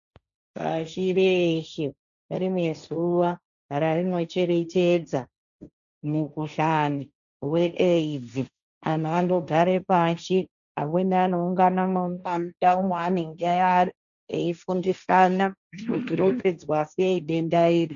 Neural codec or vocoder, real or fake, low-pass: codec, 16 kHz, 1.1 kbps, Voila-Tokenizer; fake; 7.2 kHz